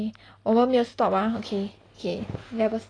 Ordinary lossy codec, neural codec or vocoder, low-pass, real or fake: AAC, 32 kbps; none; 9.9 kHz; real